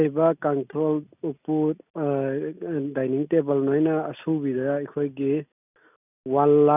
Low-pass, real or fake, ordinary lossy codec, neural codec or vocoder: 3.6 kHz; real; none; none